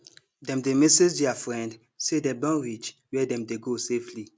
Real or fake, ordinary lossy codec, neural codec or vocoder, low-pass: real; none; none; none